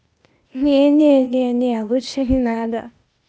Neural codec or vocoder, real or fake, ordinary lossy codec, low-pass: codec, 16 kHz, 0.8 kbps, ZipCodec; fake; none; none